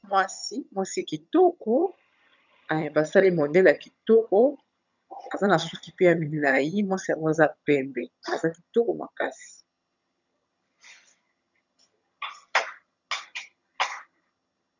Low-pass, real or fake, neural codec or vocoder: 7.2 kHz; fake; vocoder, 22.05 kHz, 80 mel bands, HiFi-GAN